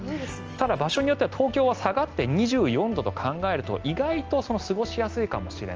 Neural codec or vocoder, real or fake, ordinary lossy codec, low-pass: none; real; Opus, 24 kbps; 7.2 kHz